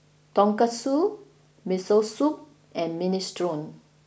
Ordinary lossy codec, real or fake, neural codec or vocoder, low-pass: none; real; none; none